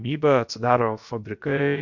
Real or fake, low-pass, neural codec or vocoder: fake; 7.2 kHz; codec, 16 kHz, about 1 kbps, DyCAST, with the encoder's durations